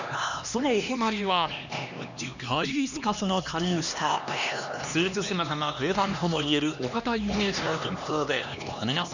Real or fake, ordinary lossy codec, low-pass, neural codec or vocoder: fake; none; 7.2 kHz; codec, 16 kHz, 2 kbps, X-Codec, HuBERT features, trained on LibriSpeech